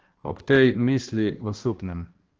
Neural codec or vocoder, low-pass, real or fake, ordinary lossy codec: codec, 16 kHz, 1 kbps, X-Codec, HuBERT features, trained on balanced general audio; 7.2 kHz; fake; Opus, 16 kbps